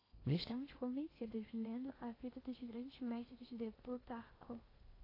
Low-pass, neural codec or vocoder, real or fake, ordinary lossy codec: 5.4 kHz; codec, 16 kHz in and 24 kHz out, 0.8 kbps, FocalCodec, streaming, 65536 codes; fake; AAC, 24 kbps